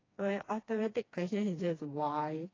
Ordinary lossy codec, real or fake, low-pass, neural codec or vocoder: AAC, 32 kbps; fake; 7.2 kHz; codec, 16 kHz, 2 kbps, FreqCodec, smaller model